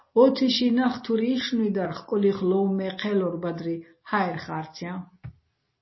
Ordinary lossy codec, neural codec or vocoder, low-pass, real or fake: MP3, 24 kbps; none; 7.2 kHz; real